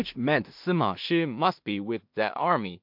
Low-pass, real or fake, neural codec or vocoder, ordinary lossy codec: 5.4 kHz; fake; codec, 16 kHz in and 24 kHz out, 0.4 kbps, LongCat-Audio-Codec, two codebook decoder; MP3, 48 kbps